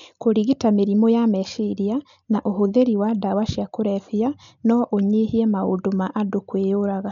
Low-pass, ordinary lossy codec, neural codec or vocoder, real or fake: 7.2 kHz; MP3, 96 kbps; none; real